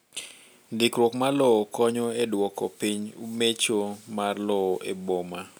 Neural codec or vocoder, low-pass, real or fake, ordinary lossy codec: none; none; real; none